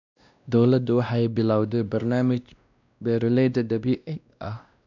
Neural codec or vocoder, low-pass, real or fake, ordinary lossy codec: codec, 16 kHz, 1 kbps, X-Codec, WavLM features, trained on Multilingual LibriSpeech; 7.2 kHz; fake; none